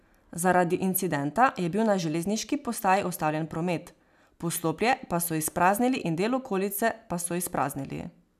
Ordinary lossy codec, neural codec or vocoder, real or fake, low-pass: none; none; real; 14.4 kHz